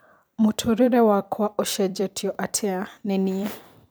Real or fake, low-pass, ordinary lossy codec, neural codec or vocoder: fake; none; none; vocoder, 44.1 kHz, 128 mel bands every 256 samples, BigVGAN v2